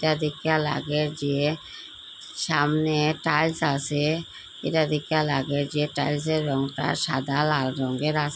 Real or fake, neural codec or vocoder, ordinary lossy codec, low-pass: real; none; none; none